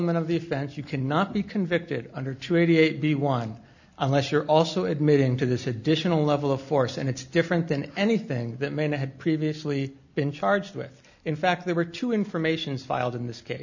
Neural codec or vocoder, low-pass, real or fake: none; 7.2 kHz; real